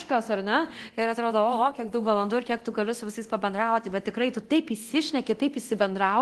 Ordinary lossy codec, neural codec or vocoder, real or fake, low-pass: Opus, 16 kbps; codec, 24 kHz, 0.9 kbps, DualCodec; fake; 10.8 kHz